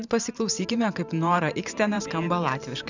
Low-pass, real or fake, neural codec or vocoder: 7.2 kHz; fake; vocoder, 44.1 kHz, 128 mel bands every 512 samples, BigVGAN v2